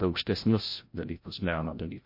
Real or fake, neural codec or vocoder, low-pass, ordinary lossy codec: fake; codec, 16 kHz, 0.5 kbps, FreqCodec, larger model; 5.4 kHz; MP3, 32 kbps